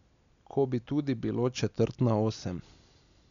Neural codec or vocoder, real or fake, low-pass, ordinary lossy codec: none; real; 7.2 kHz; none